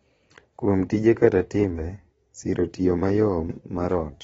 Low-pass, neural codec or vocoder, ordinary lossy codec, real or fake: 9.9 kHz; vocoder, 22.05 kHz, 80 mel bands, Vocos; AAC, 24 kbps; fake